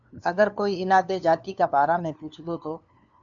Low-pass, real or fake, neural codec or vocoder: 7.2 kHz; fake; codec, 16 kHz, 2 kbps, FunCodec, trained on LibriTTS, 25 frames a second